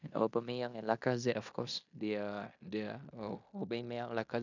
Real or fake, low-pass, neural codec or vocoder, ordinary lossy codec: fake; 7.2 kHz; codec, 16 kHz in and 24 kHz out, 0.9 kbps, LongCat-Audio-Codec, fine tuned four codebook decoder; none